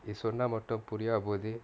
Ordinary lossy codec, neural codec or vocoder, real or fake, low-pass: none; none; real; none